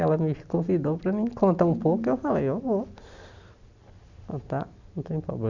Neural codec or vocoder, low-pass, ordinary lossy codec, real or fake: none; 7.2 kHz; none; real